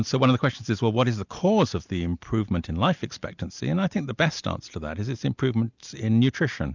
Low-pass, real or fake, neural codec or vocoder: 7.2 kHz; real; none